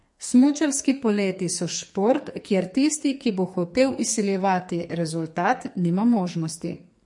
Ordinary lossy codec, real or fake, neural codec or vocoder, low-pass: MP3, 48 kbps; fake; codec, 44.1 kHz, 2.6 kbps, SNAC; 10.8 kHz